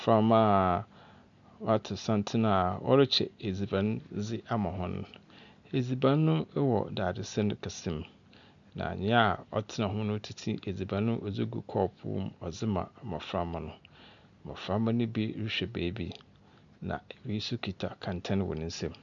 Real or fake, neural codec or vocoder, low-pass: real; none; 7.2 kHz